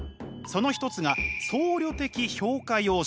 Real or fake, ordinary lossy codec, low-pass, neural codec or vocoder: real; none; none; none